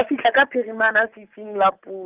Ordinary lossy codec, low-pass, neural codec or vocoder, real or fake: Opus, 32 kbps; 3.6 kHz; none; real